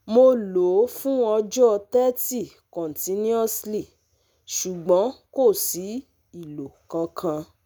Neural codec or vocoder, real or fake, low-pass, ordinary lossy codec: none; real; none; none